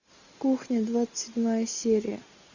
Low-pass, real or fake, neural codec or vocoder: 7.2 kHz; real; none